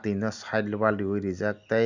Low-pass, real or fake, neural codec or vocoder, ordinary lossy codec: 7.2 kHz; real; none; none